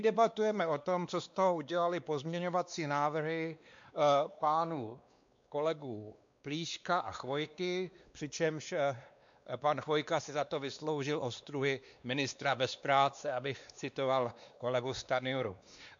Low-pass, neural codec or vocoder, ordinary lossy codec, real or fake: 7.2 kHz; codec, 16 kHz, 2 kbps, X-Codec, WavLM features, trained on Multilingual LibriSpeech; MP3, 64 kbps; fake